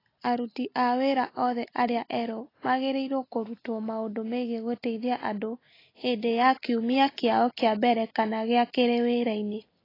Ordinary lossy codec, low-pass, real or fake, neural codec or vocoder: AAC, 24 kbps; 5.4 kHz; real; none